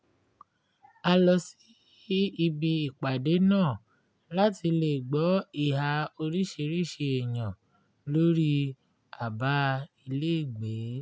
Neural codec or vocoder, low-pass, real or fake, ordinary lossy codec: none; none; real; none